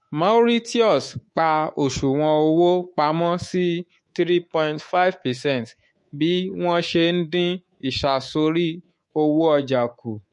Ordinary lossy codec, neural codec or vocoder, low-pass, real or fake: MP3, 48 kbps; codec, 24 kHz, 3.1 kbps, DualCodec; 10.8 kHz; fake